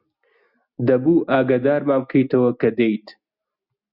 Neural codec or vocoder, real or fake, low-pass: none; real; 5.4 kHz